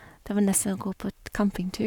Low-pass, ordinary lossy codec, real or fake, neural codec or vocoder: 19.8 kHz; none; fake; codec, 44.1 kHz, 7.8 kbps, Pupu-Codec